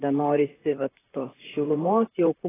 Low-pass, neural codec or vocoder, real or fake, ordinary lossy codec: 3.6 kHz; vocoder, 44.1 kHz, 128 mel bands, Pupu-Vocoder; fake; AAC, 16 kbps